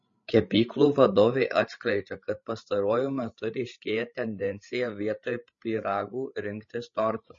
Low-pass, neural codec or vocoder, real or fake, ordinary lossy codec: 7.2 kHz; codec, 16 kHz, 16 kbps, FreqCodec, larger model; fake; MP3, 32 kbps